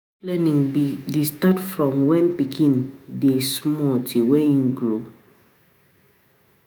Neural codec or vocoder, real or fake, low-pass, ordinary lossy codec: autoencoder, 48 kHz, 128 numbers a frame, DAC-VAE, trained on Japanese speech; fake; none; none